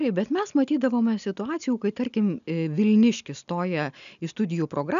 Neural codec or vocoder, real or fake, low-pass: none; real; 7.2 kHz